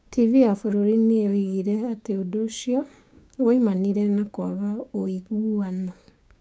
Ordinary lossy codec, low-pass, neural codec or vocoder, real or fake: none; none; codec, 16 kHz, 6 kbps, DAC; fake